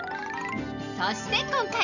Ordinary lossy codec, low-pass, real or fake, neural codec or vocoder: none; 7.2 kHz; real; none